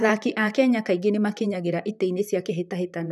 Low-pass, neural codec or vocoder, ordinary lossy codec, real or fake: 14.4 kHz; vocoder, 44.1 kHz, 128 mel bands, Pupu-Vocoder; none; fake